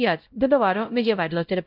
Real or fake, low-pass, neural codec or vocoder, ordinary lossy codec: fake; 5.4 kHz; codec, 16 kHz, 0.5 kbps, X-Codec, WavLM features, trained on Multilingual LibriSpeech; Opus, 24 kbps